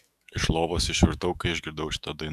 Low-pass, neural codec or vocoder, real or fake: 14.4 kHz; autoencoder, 48 kHz, 128 numbers a frame, DAC-VAE, trained on Japanese speech; fake